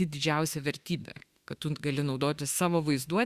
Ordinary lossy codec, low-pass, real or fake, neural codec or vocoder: Opus, 64 kbps; 14.4 kHz; fake; autoencoder, 48 kHz, 32 numbers a frame, DAC-VAE, trained on Japanese speech